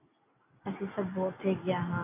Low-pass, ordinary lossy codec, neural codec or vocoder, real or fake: 3.6 kHz; AAC, 24 kbps; none; real